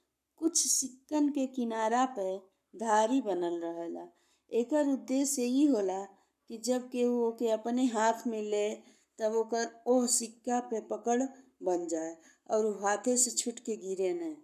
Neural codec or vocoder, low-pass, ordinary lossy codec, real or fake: codec, 44.1 kHz, 7.8 kbps, Pupu-Codec; 14.4 kHz; none; fake